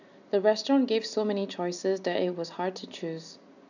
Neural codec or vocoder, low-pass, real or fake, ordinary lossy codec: none; 7.2 kHz; real; none